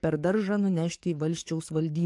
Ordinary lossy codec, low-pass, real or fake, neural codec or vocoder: AAC, 64 kbps; 10.8 kHz; fake; codec, 44.1 kHz, 7.8 kbps, DAC